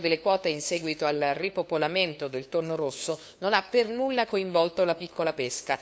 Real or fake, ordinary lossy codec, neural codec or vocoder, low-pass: fake; none; codec, 16 kHz, 2 kbps, FunCodec, trained on LibriTTS, 25 frames a second; none